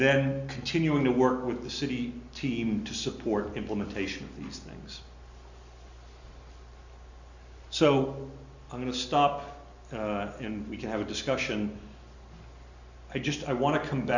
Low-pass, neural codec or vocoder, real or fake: 7.2 kHz; none; real